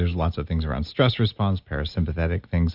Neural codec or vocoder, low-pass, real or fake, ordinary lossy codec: none; 5.4 kHz; real; Opus, 64 kbps